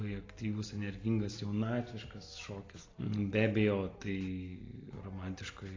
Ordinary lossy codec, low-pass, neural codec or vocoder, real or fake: AAC, 32 kbps; 7.2 kHz; none; real